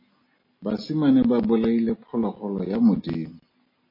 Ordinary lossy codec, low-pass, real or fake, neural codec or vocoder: MP3, 24 kbps; 5.4 kHz; real; none